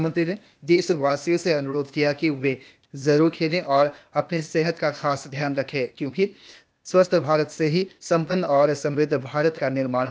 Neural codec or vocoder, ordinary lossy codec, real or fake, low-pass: codec, 16 kHz, 0.8 kbps, ZipCodec; none; fake; none